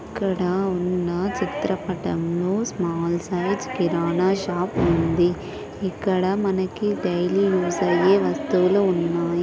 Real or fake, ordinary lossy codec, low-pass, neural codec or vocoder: real; none; none; none